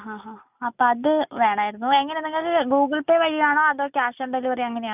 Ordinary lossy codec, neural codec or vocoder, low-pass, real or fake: none; none; 3.6 kHz; real